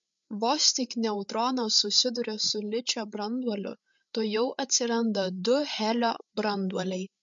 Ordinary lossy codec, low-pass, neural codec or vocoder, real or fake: MP3, 64 kbps; 7.2 kHz; codec, 16 kHz, 8 kbps, FreqCodec, larger model; fake